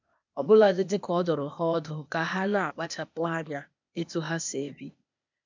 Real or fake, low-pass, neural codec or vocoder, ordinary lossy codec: fake; 7.2 kHz; codec, 16 kHz, 0.8 kbps, ZipCodec; MP3, 64 kbps